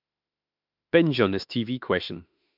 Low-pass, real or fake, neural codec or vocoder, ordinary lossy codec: 5.4 kHz; fake; autoencoder, 48 kHz, 32 numbers a frame, DAC-VAE, trained on Japanese speech; MP3, 48 kbps